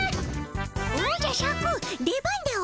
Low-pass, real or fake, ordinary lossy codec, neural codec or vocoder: none; real; none; none